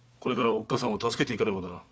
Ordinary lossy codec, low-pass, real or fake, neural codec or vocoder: none; none; fake; codec, 16 kHz, 4 kbps, FunCodec, trained on Chinese and English, 50 frames a second